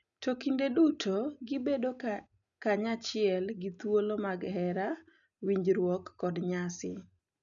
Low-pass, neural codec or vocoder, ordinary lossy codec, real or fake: 7.2 kHz; none; none; real